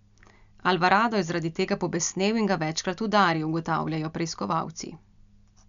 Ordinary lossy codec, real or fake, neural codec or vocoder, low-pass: none; real; none; 7.2 kHz